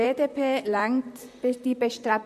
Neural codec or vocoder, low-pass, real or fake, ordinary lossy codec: vocoder, 44.1 kHz, 128 mel bands, Pupu-Vocoder; 14.4 kHz; fake; MP3, 64 kbps